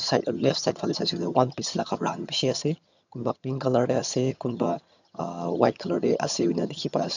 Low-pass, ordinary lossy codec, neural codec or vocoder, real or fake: 7.2 kHz; none; vocoder, 22.05 kHz, 80 mel bands, HiFi-GAN; fake